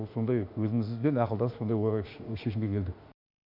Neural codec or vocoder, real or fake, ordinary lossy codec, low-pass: autoencoder, 48 kHz, 32 numbers a frame, DAC-VAE, trained on Japanese speech; fake; none; 5.4 kHz